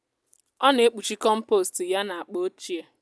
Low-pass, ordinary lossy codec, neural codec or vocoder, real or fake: none; none; none; real